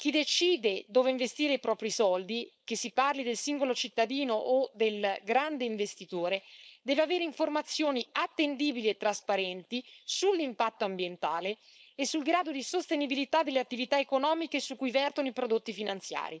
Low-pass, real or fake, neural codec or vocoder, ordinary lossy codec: none; fake; codec, 16 kHz, 4.8 kbps, FACodec; none